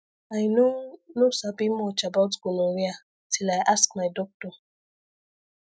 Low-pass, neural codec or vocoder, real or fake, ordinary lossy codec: none; none; real; none